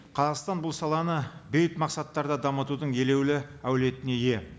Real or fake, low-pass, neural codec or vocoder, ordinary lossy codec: real; none; none; none